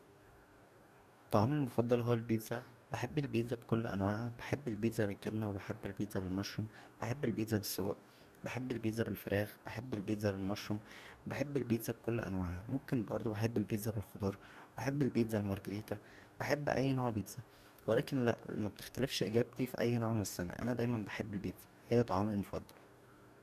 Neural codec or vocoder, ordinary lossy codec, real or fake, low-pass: codec, 44.1 kHz, 2.6 kbps, DAC; none; fake; 14.4 kHz